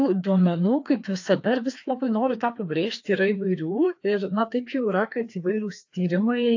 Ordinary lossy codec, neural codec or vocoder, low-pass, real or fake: AAC, 48 kbps; codec, 16 kHz, 2 kbps, FreqCodec, larger model; 7.2 kHz; fake